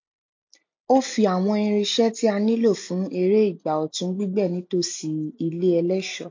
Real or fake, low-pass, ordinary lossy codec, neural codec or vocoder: real; 7.2 kHz; MP3, 48 kbps; none